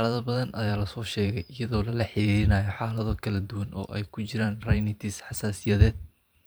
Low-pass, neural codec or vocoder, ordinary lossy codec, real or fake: none; vocoder, 44.1 kHz, 128 mel bands every 512 samples, BigVGAN v2; none; fake